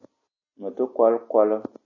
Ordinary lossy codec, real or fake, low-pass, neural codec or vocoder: MP3, 32 kbps; real; 7.2 kHz; none